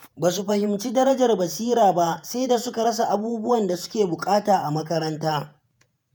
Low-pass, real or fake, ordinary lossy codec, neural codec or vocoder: none; fake; none; vocoder, 48 kHz, 128 mel bands, Vocos